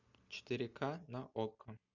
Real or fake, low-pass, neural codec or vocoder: real; 7.2 kHz; none